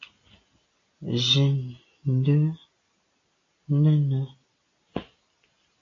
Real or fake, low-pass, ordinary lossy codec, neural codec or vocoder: real; 7.2 kHz; AAC, 32 kbps; none